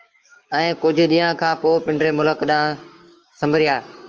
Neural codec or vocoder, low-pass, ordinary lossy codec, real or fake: codec, 16 kHz, 6 kbps, DAC; 7.2 kHz; Opus, 24 kbps; fake